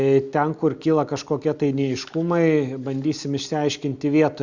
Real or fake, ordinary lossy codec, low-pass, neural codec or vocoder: real; Opus, 64 kbps; 7.2 kHz; none